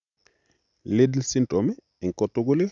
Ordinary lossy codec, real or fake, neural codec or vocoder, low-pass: none; real; none; 7.2 kHz